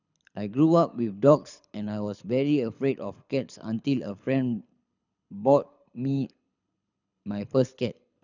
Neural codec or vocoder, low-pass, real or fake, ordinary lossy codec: codec, 24 kHz, 6 kbps, HILCodec; 7.2 kHz; fake; none